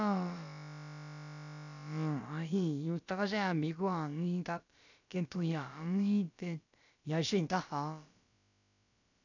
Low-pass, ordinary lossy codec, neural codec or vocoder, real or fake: 7.2 kHz; none; codec, 16 kHz, about 1 kbps, DyCAST, with the encoder's durations; fake